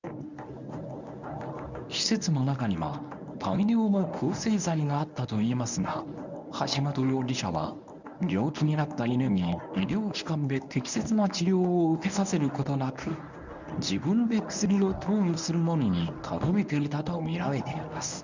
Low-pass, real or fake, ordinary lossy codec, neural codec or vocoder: 7.2 kHz; fake; none; codec, 24 kHz, 0.9 kbps, WavTokenizer, medium speech release version 1